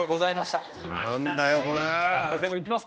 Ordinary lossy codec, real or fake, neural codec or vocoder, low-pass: none; fake; codec, 16 kHz, 1 kbps, X-Codec, HuBERT features, trained on general audio; none